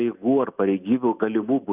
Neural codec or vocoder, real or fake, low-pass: none; real; 3.6 kHz